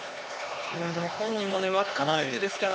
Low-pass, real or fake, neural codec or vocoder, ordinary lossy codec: none; fake; codec, 16 kHz, 2 kbps, X-Codec, HuBERT features, trained on LibriSpeech; none